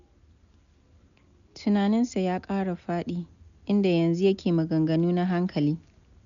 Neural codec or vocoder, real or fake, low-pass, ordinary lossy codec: none; real; 7.2 kHz; none